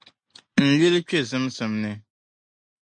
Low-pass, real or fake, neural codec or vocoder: 9.9 kHz; real; none